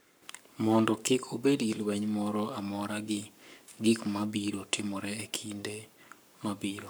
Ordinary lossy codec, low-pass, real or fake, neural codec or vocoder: none; none; fake; codec, 44.1 kHz, 7.8 kbps, Pupu-Codec